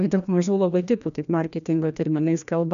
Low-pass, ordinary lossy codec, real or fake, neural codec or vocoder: 7.2 kHz; MP3, 96 kbps; fake; codec, 16 kHz, 1 kbps, FreqCodec, larger model